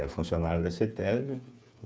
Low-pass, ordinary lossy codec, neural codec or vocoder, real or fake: none; none; codec, 16 kHz, 8 kbps, FreqCodec, smaller model; fake